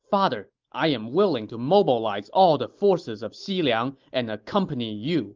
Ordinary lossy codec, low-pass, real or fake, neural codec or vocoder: Opus, 32 kbps; 7.2 kHz; real; none